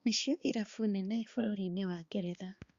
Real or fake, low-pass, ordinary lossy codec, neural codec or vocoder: fake; 7.2 kHz; Opus, 64 kbps; codec, 16 kHz, 2 kbps, X-Codec, HuBERT features, trained on balanced general audio